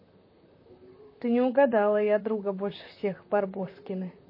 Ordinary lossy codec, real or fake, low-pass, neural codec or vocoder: MP3, 24 kbps; fake; 5.4 kHz; vocoder, 44.1 kHz, 128 mel bands, Pupu-Vocoder